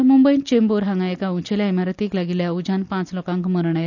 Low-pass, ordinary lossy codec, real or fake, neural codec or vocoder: 7.2 kHz; none; real; none